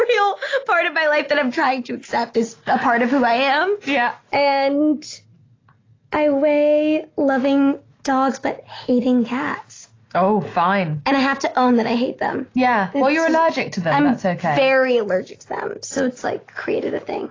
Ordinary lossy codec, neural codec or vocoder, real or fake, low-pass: AAC, 32 kbps; none; real; 7.2 kHz